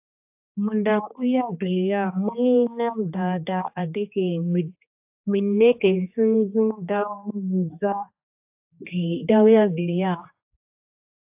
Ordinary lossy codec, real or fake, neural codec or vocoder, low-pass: AAC, 32 kbps; fake; codec, 16 kHz, 2 kbps, X-Codec, HuBERT features, trained on general audio; 3.6 kHz